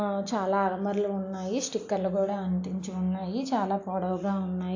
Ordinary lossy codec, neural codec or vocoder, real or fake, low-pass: AAC, 48 kbps; none; real; 7.2 kHz